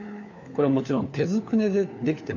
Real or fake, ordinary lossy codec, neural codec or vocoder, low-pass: fake; none; codec, 16 kHz, 4 kbps, FunCodec, trained on LibriTTS, 50 frames a second; 7.2 kHz